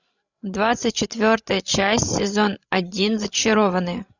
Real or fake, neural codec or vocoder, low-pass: fake; codec, 16 kHz, 16 kbps, FreqCodec, larger model; 7.2 kHz